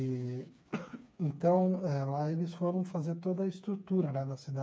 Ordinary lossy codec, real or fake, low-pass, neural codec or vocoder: none; fake; none; codec, 16 kHz, 4 kbps, FreqCodec, smaller model